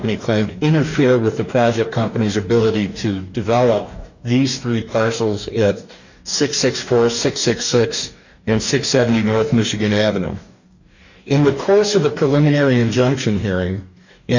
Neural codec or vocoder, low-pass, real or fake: codec, 44.1 kHz, 2.6 kbps, DAC; 7.2 kHz; fake